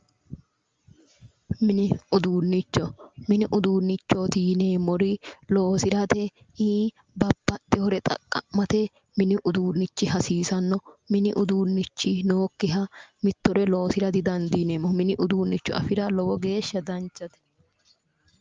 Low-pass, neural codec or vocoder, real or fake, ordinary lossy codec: 7.2 kHz; none; real; Opus, 32 kbps